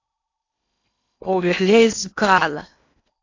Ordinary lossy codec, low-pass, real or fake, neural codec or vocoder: AAC, 48 kbps; 7.2 kHz; fake; codec, 16 kHz in and 24 kHz out, 0.8 kbps, FocalCodec, streaming, 65536 codes